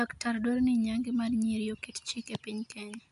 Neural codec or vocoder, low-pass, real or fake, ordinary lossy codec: none; 10.8 kHz; real; AAC, 64 kbps